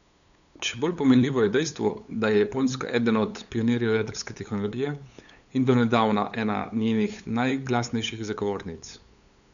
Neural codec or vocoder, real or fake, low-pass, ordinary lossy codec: codec, 16 kHz, 8 kbps, FunCodec, trained on LibriTTS, 25 frames a second; fake; 7.2 kHz; none